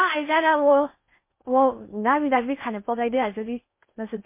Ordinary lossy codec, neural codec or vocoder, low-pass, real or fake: MP3, 24 kbps; codec, 16 kHz in and 24 kHz out, 0.6 kbps, FocalCodec, streaming, 4096 codes; 3.6 kHz; fake